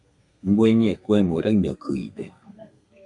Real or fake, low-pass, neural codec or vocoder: fake; 10.8 kHz; codec, 32 kHz, 1.9 kbps, SNAC